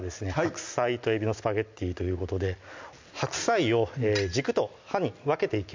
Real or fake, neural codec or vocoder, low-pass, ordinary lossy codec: real; none; 7.2 kHz; MP3, 64 kbps